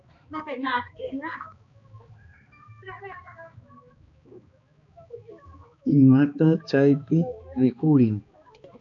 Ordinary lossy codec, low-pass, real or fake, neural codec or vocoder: MP3, 64 kbps; 7.2 kHz; fake; codec, 16 kHz, 2 kbps, X-Codec, HuBERT features, trained on balanced general audio